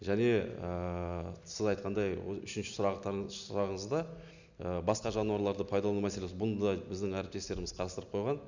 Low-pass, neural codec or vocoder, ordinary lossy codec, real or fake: 7.2 kHz; none; none; real